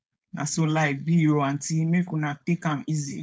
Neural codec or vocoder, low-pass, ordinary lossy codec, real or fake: codec, 16 kHz, 4.8 kbps, FACodec; none; none; fake